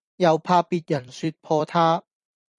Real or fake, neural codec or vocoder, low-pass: fake; vocoder, 44.1 kHz, 128 mel bands every 256 samples, BigVGAN v2; 10.8 kHz